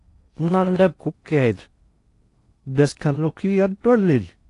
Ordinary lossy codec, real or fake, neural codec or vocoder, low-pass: AAC, 48 kbps; fake; codec, 16 kHz in and 24 kHz out, 0.6 kbps, FocalCodec, streaming, 4096 codes; 10.8 kHz